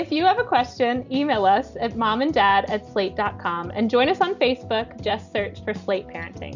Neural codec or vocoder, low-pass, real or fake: none; 7.2 kHz; real